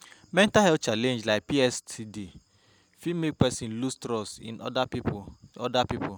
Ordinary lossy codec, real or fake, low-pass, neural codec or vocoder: none; real; none; none